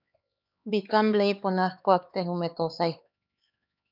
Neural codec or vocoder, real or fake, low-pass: codec, 16 kHz, 4 kbps, X-Codec, HuBERT features, trained on LibriSpeech; fake; 5.4 kHz